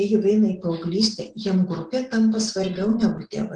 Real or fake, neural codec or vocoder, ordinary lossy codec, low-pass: real; none; Opus, 16 kbps; 9.9 kHz